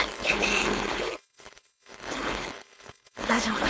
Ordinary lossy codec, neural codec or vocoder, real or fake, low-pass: none; codec, 16 kHz, 4.8 kbps, FACodec; fake; none